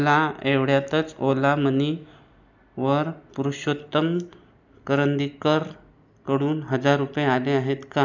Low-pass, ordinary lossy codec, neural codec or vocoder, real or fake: 7.2 kHz; none; none; real